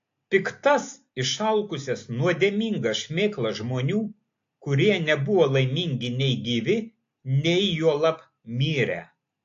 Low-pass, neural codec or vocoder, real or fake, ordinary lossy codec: 7.2 kHz; none; real; AAC, 48 kbps